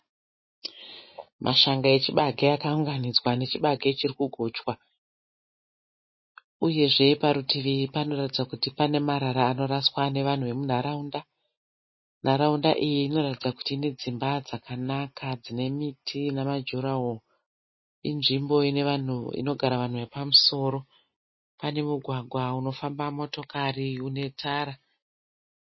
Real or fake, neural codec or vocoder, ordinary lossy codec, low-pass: real; none; MP3, 24 kbps; 7.2 kHz